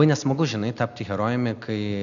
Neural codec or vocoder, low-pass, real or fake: none; 7.2 kHz; real